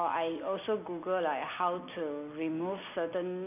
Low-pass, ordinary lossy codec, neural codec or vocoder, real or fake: 3.6 kHz; none; none; real